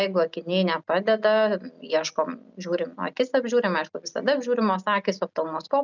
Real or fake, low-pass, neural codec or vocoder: real; 7.2 kHz; none